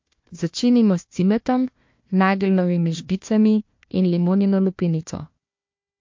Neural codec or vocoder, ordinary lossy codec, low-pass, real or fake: codec, 16 kHz, 1 kbps, FunCodec, trained on Chinese and English, 50 frames a second; MP3, 48 kbps; 7.2 kHz; fake